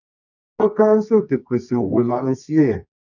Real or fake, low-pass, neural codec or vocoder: fake; 7.2 kHz; codec, 24 kHz, 0.9 kbps, WavTokenizer, medium music audio release